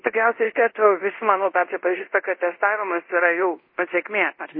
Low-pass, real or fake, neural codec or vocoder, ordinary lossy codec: 5.4 kHz; fake; codec, 24 kHz, 0.5 kbps, DualCodec; MP3, 24 kbps